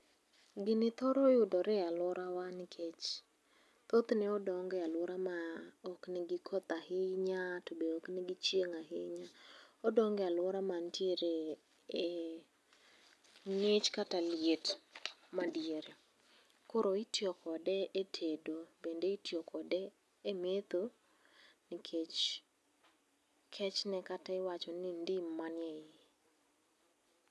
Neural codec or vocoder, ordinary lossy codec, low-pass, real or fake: none; none; none; real